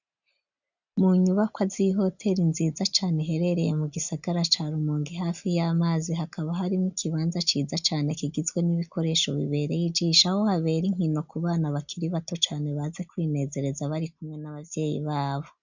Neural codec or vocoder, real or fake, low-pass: none; real; 7.2 kHz